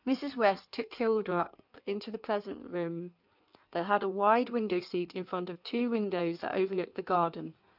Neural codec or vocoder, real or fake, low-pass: codec, 16 kHz in and 24 kHz out, 1.1 kbps, FireRedTTS-2 codec; fake; 5.4 kHz